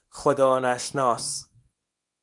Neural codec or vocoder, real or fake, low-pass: codec, 24 kHz, 0.9 kbps, WavTokenizer, small release; fake; 10.8 kHz